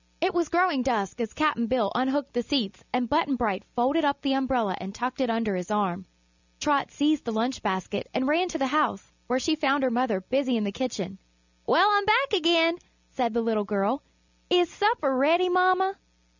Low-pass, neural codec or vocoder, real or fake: 7.2 kHz; none; real